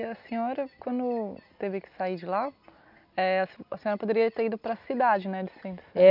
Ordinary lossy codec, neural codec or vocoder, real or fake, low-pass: none; none; real; 5.4 kHz